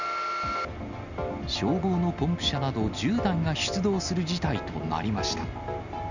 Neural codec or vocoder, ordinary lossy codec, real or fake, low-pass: none; none; real; 7.2 kHz